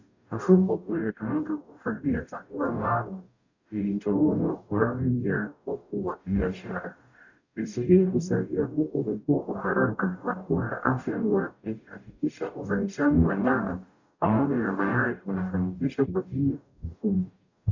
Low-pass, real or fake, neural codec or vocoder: 7.2 kHz; fake; codec, 44.1 kHz, 0.9 kbps, DAC